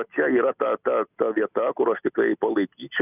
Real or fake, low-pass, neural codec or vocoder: real; 3.6 kHz; none